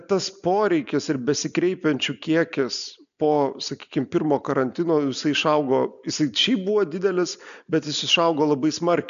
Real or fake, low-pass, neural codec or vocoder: real; 7.2 kHz; none